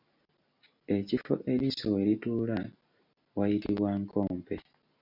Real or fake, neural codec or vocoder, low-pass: real; none; 5.4 kHz